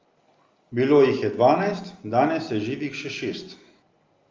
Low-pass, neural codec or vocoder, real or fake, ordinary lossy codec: 7.2 kHz; none; real; Opus, 32 kbps